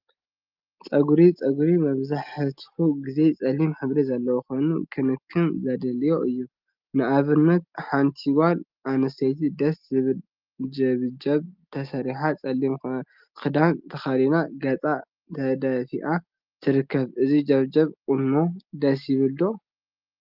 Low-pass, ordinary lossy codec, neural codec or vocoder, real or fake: 5.4 kHz; Opus, 24 kbps; none; real